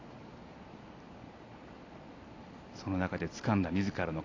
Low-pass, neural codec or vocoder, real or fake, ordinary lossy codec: 7.2 kHz; none; real; AAC, 32 kbps